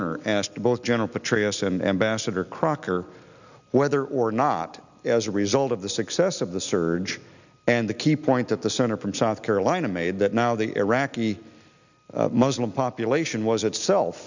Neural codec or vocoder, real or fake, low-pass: none; real; 7.2 kHz